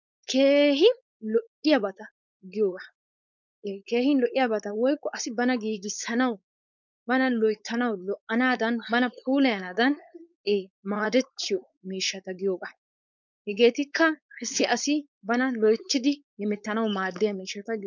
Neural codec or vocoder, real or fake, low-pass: codec, 16 kHz, 4.8 kbps, FACodec; fake; 7.2 kHz